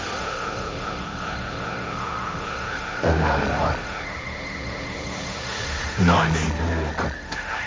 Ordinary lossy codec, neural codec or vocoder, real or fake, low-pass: none; codec, 16 kHz, 1.1 kbps, Voila-Tokenizer; fake; none